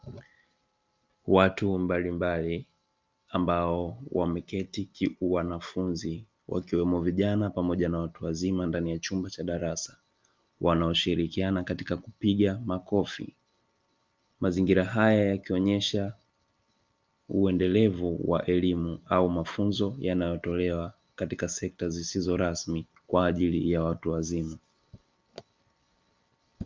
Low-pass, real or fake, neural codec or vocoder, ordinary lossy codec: 7.2 kHz; real; none; Opus, 24 kbps